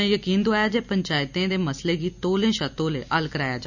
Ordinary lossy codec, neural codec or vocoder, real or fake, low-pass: none; none; real; 7.2 kHz